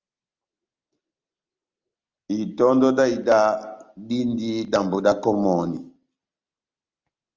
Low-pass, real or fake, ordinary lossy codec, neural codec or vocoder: 7.2 kHz; real; Opus, 32 kbps; none